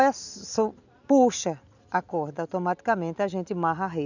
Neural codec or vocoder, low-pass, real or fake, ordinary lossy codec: none; 7.2 kHz; real; none